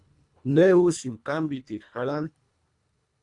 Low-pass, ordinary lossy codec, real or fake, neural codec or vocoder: 10.8 kHz; MP3, 96 kbps; fake; codec, 24 kHz, 1.5 kbps, HILCodec